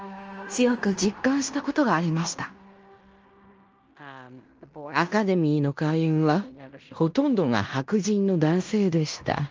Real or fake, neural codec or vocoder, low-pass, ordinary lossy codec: fake; codec, 16 kHz in and 24 kHz out, 0.9 kbps, LongCat-Audio-Codec, fine tuned four codebook decoder; 7.2 kHz; Opus, 24 kbps